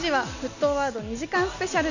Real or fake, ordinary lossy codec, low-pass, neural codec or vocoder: real; none; 7.2 kHz; none